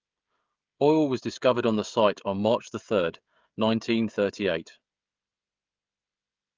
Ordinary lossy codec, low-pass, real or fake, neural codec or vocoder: Opus, 24 kbps; 7.2 kHz; fake; codec, 16 kHz, 16 kbps, FreqCodec, smaller model